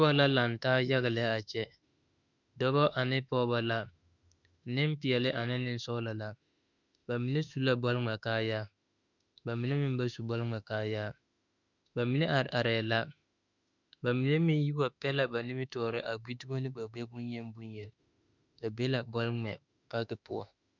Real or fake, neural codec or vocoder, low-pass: fake; autoencoder, 48 kHz, 32 numbers a frame, DAC-VAE, trained on Japanese speech; 7.2 kHz